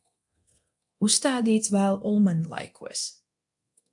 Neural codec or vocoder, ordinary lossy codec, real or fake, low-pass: codec, 24 kHz, 0.9 kbps, DualCodec; AAC, 64 kbps; fake; 10.8 kHz